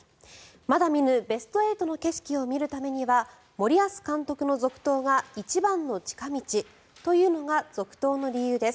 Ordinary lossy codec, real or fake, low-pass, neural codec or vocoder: none; real; none; none